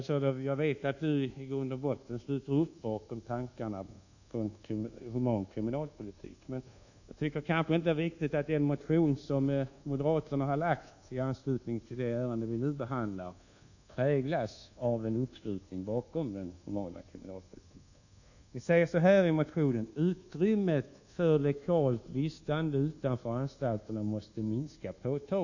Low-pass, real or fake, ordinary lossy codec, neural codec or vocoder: 7.2 kHz; fake; none; codec, 24 kHz, 1.2 kbps, DualCodec